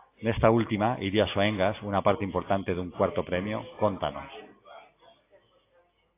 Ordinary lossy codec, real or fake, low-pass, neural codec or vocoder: AAC, 24 kbps; real; 3.6 kHz; none